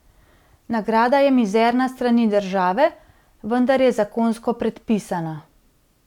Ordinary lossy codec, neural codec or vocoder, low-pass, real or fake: none; none; 19.8 kHz; real